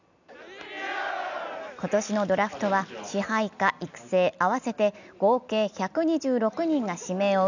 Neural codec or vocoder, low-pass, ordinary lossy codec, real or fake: none; 7.2 kHz; none; real